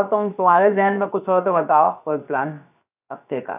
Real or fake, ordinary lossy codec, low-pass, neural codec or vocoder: fake; none; 3.6 kHz; codec, 16 kHz, about 1 kbps, DyCAST, with the encoder's durations